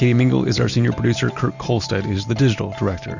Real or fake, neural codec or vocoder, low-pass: real; none; 7.2 kHz